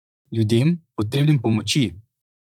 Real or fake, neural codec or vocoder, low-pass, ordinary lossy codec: fake; vocoder, 44.1 kHz, 128 mel bands, Pupu-Vocoder; 19.8 kHz; none